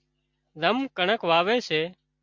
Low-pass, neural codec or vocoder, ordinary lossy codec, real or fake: 7.2 kHz; none; MP3, 64 kbps; real